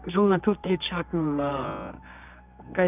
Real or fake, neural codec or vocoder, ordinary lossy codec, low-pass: fake; codec, 24 kHz, 0.9 kbps, WavTokenizer, medium music audio release; none; 3.6 kHz